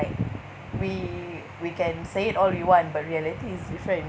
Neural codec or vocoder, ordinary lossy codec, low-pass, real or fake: none; none; none; real